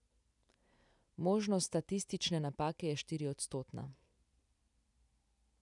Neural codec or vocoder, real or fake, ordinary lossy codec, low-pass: none; real; none; 10.8 kHz